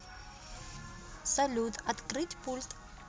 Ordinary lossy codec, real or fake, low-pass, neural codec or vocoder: none; real; none; none